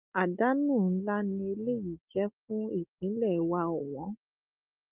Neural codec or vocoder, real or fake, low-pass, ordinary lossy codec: none; real; 3.6 kHz; Opus, 32 kbps